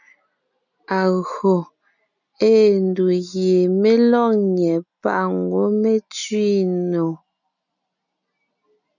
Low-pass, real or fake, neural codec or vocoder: 7.2 kHz; real; none